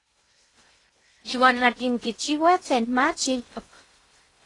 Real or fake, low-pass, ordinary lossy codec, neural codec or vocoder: fake; 10.8 kHz; AAC, 32 kbps; codec, 16 kHz in and 24 kHz out, 0.6 kbps, FocalCodec, streaming, 2048 codes